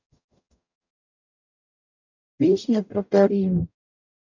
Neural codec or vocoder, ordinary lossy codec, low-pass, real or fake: codec, 44.1 kHz, 0.9 kbps, DAC; none; 7.2 kHz; fake